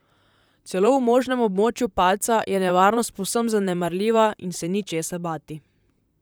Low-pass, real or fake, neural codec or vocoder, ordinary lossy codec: none; fake; vocoder, 44.1 kHz, 128 mel bands, Pupu-Vocoder; none